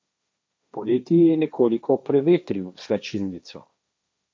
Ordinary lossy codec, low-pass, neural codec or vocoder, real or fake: none; none; codec, 16 kHz, 1.1 kbps, Voila-Tokenizer; fake